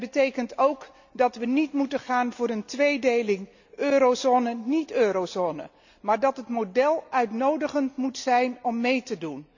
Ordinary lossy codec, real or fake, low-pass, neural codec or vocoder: none; real; 7.2 kHz; none